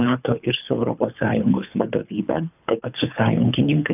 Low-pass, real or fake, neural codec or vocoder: 3.6 kHz; fake; codec, 24 kHz, 3 kbps, HILCodec